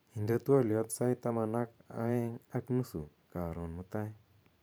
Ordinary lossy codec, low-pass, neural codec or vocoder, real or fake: none; none; vocoder, 44.1 kHz, 128 mel bands every 512 samples, BigVGAN v2; fake